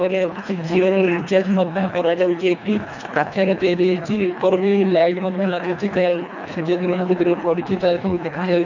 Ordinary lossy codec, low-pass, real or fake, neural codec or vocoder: none; 7.2 kHz; fake; codec, 24 kHz, 1.5 kbps, HILCodec